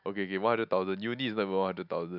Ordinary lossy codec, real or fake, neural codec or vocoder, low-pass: none; real; none; 5.4 kHz